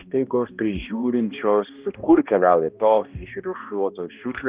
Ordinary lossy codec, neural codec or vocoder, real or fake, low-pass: Opus, 24 kbps; codec, 16 kHz, 1 kbps, X-Codec, HuBERT features, trained on balanced general audio; fake; 3.6 kHz